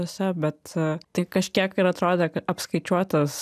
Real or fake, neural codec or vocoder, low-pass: real; none; 14.4 kHz